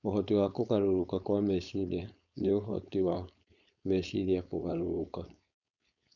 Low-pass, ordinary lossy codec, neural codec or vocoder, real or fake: 7.2 kHz; none; codec, 16 kHz, 4.8 kbps, FACodec; fake